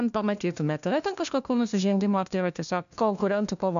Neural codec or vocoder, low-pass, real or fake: codec, 16 kHz, 1 kbps, FunCodec, trained on LibriTTS, 50 frames a second; 7.2 kHz; fake